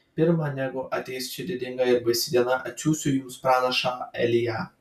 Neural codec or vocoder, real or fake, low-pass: none; real; 14.4 kHz